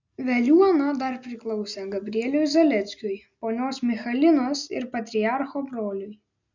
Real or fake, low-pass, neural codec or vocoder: real; 7.2 kHz; none